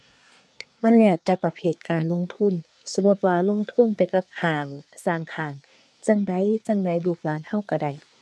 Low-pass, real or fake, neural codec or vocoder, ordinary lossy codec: none; fake; codec, 24 kHz, 1 kbps, SNAC; none